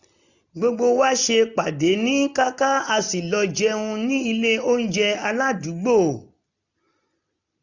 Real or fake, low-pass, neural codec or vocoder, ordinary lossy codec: real; 7.2 kHz; none; none